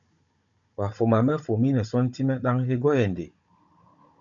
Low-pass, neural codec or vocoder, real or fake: 7.2 kHz; codec, 16 kHz, 16 kbps, FunCodec, trained on Chinese and English, 50 frames a second; fake